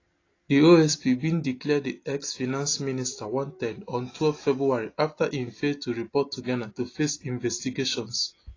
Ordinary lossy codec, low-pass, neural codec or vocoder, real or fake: AAC, 32 kbps; 7.2 kHz; none; real